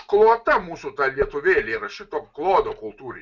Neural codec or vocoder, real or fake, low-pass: none; real; 7.2 kHz